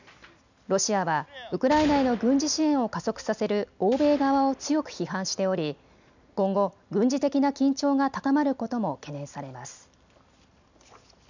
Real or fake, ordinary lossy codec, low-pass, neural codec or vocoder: real; none; 7.2 kHz; none